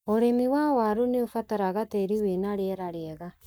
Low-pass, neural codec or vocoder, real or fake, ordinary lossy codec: none; codec, 44.1 kHz, 7.8 kbps, Pupu-Codec; fake; none